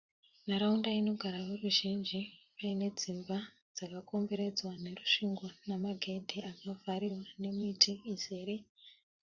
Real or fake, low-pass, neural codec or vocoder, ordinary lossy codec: fake; 7.2 kHz; vocoder, 44.1 kHz, 80 mel bands, Vocos; Opus, 64 kbps